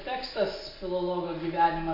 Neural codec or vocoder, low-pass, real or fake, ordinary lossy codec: none; 5.4 kHz; real; MP3, 32 kbps